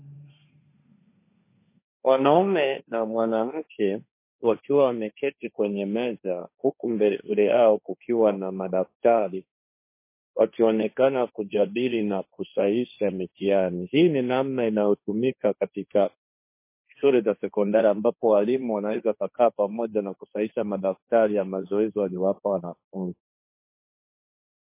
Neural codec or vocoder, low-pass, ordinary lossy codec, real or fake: codec, 16 kHz, 1.1 kbps, Voila-Tokenizer; 3.6 kHz; MP3, 24 kbps; fake